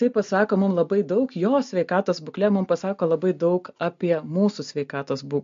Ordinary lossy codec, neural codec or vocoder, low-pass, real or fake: MP3, 48 kbps; none; 7.2 kHz; real